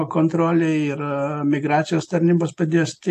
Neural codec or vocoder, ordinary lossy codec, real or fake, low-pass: none; AAC, 64 kbps; real; 14.4 kHz